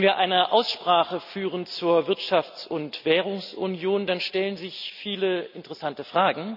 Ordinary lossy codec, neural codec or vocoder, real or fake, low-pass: none; none; real; 5.4 kHz